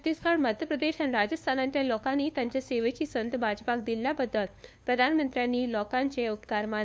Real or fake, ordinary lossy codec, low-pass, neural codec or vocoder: fake; none; none; codec, 16 kHz, 2 kbps, FunCodec, trained on LibriTTS, 25 frames a second